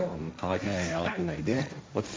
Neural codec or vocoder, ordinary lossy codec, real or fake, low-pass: codec, 16 kHz, 1.1 kbps, Voila-Tokenizer; none; fake; none